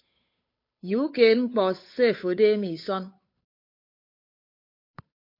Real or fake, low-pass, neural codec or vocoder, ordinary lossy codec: fake; 5.4 kHz; codec, 16 kHz, 8 kbps, FunCodec, trained on Chinese and English, 25 frames a second; MP3, 32 kbps